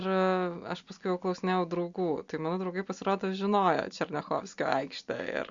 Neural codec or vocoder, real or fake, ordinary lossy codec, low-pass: none; real; Opus, 64 kbps; 7.2 kHz